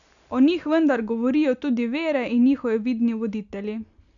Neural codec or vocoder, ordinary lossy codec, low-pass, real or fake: none; none; 7.2 kHz; real